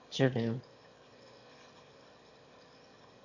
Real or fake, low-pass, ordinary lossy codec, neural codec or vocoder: fake; 7.2 kHz; none; autoencoder, 22.05 kHz, a latent of 192 numbers a frame, VITS, trained on one speaker